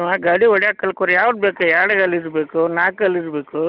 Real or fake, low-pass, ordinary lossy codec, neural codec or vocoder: real; 5.4 kHz; none; none